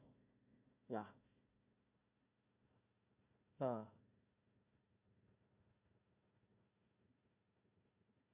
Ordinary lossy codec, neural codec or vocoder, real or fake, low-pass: none; codec, 16 kHz, 1 kbps, FunCodec, trained on Chinese and English, 50 frames a second; fake; 3.6 kHz